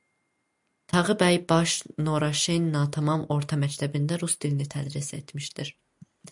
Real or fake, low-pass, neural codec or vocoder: real; 10.8 kHz; none